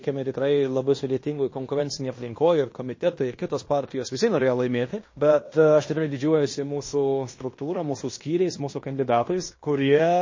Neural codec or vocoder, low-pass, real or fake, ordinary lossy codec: codec, 16 kHz in and 24 kHz out, 0.9 kbps, LongCat-Audio-Codec, fine tuned four codebook decoder; 7.2 kHz; fake; MP3, 32 kbps